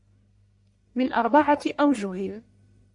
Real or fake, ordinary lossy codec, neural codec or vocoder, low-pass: fake; MP3, 64 kbps; codec, 44.1 kHz, 1.7 kbps, Pupu-Codec; 10.8 kHz